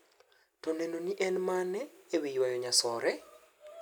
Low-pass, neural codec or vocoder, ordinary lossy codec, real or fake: none; none; none; real